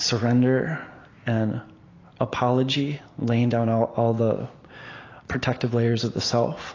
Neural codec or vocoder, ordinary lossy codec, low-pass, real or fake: none; AAC, 32 kbps; 7.2 kHz; real